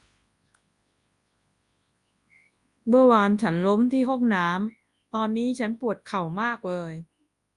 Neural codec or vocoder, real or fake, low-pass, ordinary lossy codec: codec, 24 kHz, 0.9 kbps, WavTokenizer, large speech release; fake; 10.8 kHz; Opus, 64 kbps